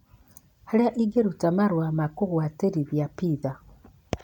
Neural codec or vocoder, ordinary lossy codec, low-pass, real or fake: none; none; 19.8 kHz; real